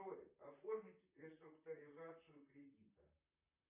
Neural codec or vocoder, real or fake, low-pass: vocoder, 44.1 kHz, 128 mel bands, Pupu-Vocoder; fake; 3.6 kHz